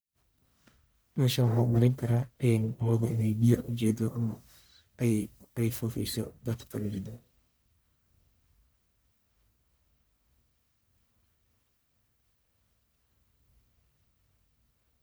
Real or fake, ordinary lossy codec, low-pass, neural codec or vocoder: fake; none; none; codec, 44.1 kHz, 1.7 kbps, Pupu-Codec